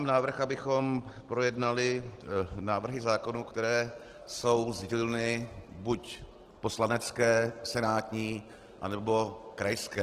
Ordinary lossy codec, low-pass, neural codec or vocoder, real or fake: Opus, 16 kbps; 14.4 kHz; none; real